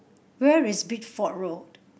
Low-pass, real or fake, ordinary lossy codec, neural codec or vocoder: none; real; none; none